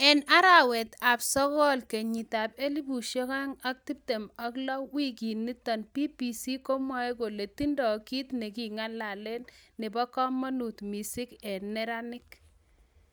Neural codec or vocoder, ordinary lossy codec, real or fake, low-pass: none; none; real; none